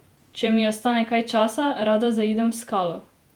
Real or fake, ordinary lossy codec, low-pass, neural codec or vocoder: fake; Opus, 32 kbps; 19.8 kHz; vocoder, 48 kHz, 128 mel bands, Vocos